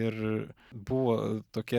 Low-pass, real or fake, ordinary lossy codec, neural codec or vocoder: 19.8 kHz; real; Opus, 64 kbps; none